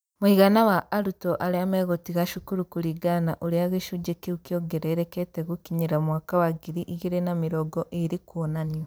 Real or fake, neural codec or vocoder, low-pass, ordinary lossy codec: fake; vocoder, 44.1 kHz, 128 mel bands every 256 samples, BigVGAN v2; none; none